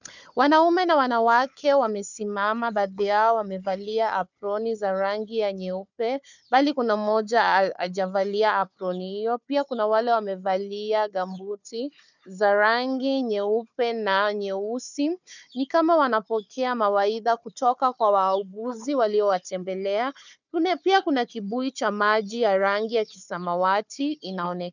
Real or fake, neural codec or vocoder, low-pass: fake; codec, 16 kHz, 4 kbps, FunCodec, trained on Chinese and English, 50 frames a second; 7.2 kHz